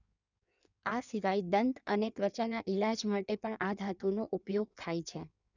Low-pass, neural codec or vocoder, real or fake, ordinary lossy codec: 7.2 kHz; codec, 16 kHz in and 24 kHz out, 1.1 kbps, FireRedTTS-2 codec; fake; none